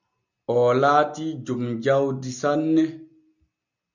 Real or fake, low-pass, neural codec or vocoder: real; 7.2 kHz; none